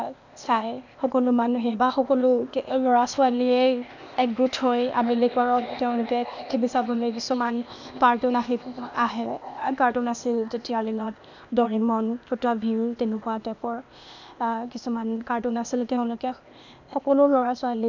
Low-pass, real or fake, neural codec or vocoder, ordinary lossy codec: 7.2 kHz; fake; codec, 16 kHz, 0.8 kbps, ZipCodec; none